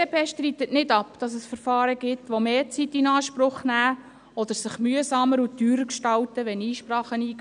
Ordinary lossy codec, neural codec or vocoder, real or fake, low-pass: none; none; real; 9.9 kHz